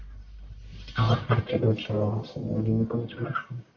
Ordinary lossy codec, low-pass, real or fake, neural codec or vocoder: MP3, 48 kbps; 7.2 kHz; fake; codec, 44.1 kHz, 1.7 kbps, Pupu-Codec